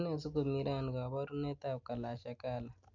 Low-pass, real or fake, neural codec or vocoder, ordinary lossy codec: 7.2 kHz; real; none; none